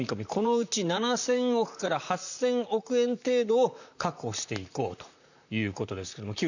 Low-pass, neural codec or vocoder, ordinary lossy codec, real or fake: 7.2 kHz; vocoder, 44.1 kHz, 128 mel bands, Pupu-Vocoder; none; fake